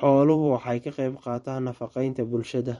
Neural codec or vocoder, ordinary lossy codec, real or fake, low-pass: vocoder, 44.1 kHz, 128 mel bands every 512 samples, BigVGAN v2; MP3, 48 kbps; fake; 19.8 kHz